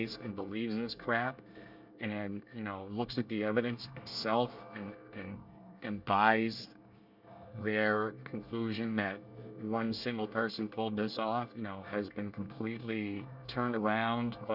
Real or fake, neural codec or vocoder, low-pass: fake; codec, 24 kHz, 1 kbps, SNAC; 5.4 kHz